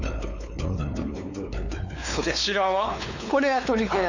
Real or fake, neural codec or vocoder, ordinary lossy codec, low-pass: fake; codec, 16 kHz, 4 kbps, X-Codec, WavLM features, trained on Multilingual LibriSpeech; none; 7.2 kHz